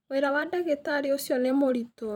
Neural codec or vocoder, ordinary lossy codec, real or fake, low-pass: vocoder, 44.1 kHz, 128 mel bands every 512 samples, BigVGAN v2; none; fake; 19.8 kHz